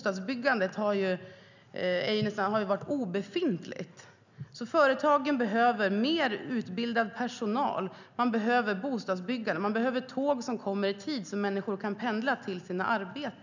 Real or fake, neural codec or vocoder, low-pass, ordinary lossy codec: real; none; 7.2 kHz; none